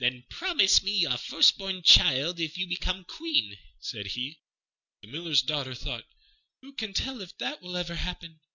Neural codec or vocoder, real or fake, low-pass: none; real; 7.2 kHz